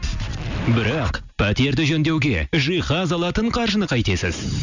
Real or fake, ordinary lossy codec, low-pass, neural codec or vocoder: real; none; 7.2 kHz; none